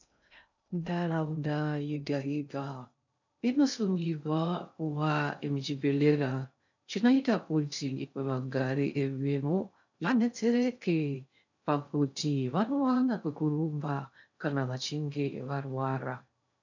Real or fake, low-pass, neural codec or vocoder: fake; 7.2 kHz; codec, 16 kHz in and 24 kHz out, 0.6 kbps, FocalCodec, streaming, 2048 codes